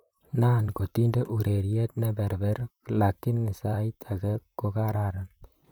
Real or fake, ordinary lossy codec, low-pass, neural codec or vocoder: fake; none; none; vocoder, 44.1 kHz, 128 mel bands, Pupu-Vocoder